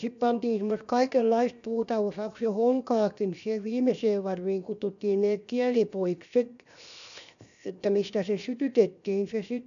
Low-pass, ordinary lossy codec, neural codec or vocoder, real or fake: 7.2 kHz; none; codec, 16 kHz, 0.7 kbps, FocalCodec; fake